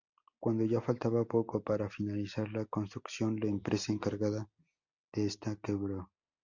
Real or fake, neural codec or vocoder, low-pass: real; none; 7.2 kHz